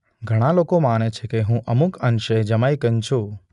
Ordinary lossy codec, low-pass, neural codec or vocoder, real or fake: none; 10.8 kHz; none; real